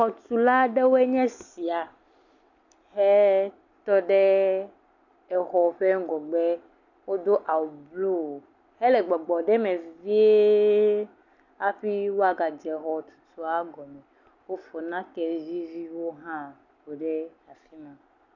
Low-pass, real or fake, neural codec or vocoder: 7.2 kHz; real; none